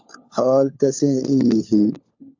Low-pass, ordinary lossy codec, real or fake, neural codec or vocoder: 7.2 kHz; AAC, 48 kbps; fake; codec, 16 kHz, 4 kbps, FunCodec, trained on LibriTTS, 50 frames a second